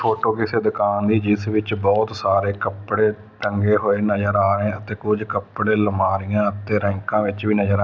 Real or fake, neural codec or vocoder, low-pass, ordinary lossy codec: real; none; none; none